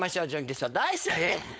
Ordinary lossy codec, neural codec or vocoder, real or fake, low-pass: none; codec, 16 kHz, 4.8 kbps, FACodec; fake; none